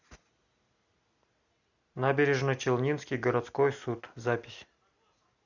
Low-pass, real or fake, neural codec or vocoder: 7.2 kHz; real; none